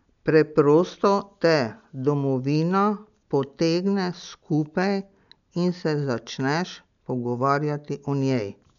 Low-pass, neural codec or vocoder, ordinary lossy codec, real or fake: 7.2 kHz; none; none; real